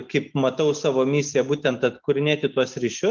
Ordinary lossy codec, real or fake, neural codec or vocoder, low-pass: Opus, 24 kbps; real; none; 7.2 kHz